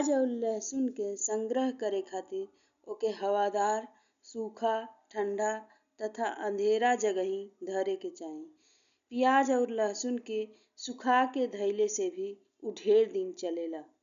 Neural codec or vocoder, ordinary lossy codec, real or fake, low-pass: none; none; real; 7.2 kHz